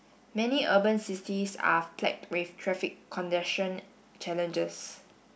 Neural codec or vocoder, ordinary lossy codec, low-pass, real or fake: none; none; none; real